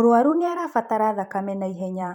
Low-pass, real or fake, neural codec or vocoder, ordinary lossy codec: 19.8 kHz; fake; vocoder, 44.1 kHz, 128 mel bands every 256 samples, BigVGAN v2; MP3, 96 kbps